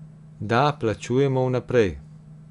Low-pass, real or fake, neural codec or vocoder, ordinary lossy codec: 10.8 kHz; real; none; none